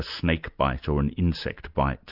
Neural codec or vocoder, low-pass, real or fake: none; 5.4 kHz; real